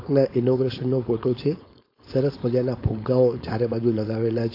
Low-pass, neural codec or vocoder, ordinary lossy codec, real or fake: 5.4 kHz; codec, 16 kHz, 4.8 kbps, FACodec; AAC, 32 kbps; fake